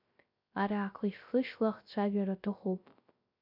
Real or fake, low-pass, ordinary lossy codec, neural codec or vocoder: fake; 5.4 kHz; MP3, 48 kbps; codec, 16 kHz, 0.3 kbps, FocalCodec